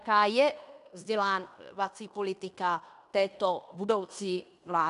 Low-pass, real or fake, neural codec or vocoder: 10.8 kHz; fake; codec, 16 kHz in and 24 kHz out, 0.9 kbps, LongCat-Audio-Codec, fine tuned four codebook decoder